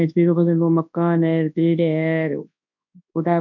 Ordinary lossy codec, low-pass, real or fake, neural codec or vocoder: none; 7.2 kHz; fake; codec, 24 kHz, 0.9 kbps, WavTokenizer, large speech release